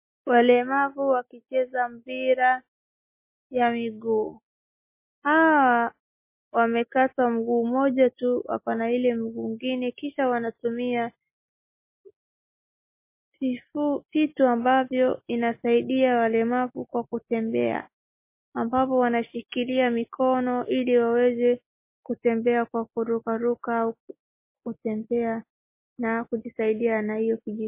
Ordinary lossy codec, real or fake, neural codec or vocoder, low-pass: MP3, 24 kbps; real; none; 3.6 kHz